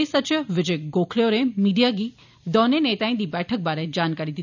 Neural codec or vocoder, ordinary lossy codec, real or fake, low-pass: none; none; real; 7.2 kHz